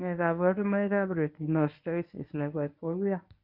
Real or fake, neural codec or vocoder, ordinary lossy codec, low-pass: fake; codec, 24 kHz, 0.9 kbps, WavTokenizer, medium speech release version 1; none; 5.4 kHz